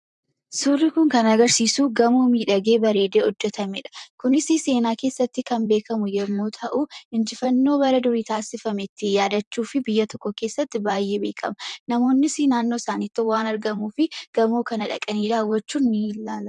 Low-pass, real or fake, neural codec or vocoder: 10.8 kHz; fake; vocoder, 44.1 kHz, 128 mel bands, Pupu-Vocoder